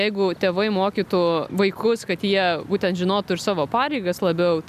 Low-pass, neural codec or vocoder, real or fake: 14.4 kHz; none; real